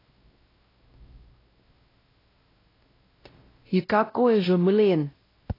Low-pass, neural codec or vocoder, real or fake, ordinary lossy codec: 5.4 kHz; codec, 16 kHz, 0.5 kbps, X-Codec, WavLM features, trained on Multilingual LibriSpeech; fake; AAC, 24 kbps